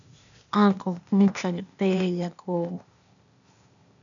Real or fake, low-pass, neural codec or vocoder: fake; 7.2 kHz; codec, 16 kHz, 0.8 kbps, ZipCodec